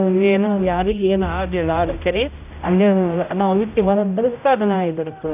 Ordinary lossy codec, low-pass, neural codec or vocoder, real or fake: none; 3.6 kHz; codec, 16 kHz, 0.5 kbps, X-Codec, HuBERT features, trained on general audio; fake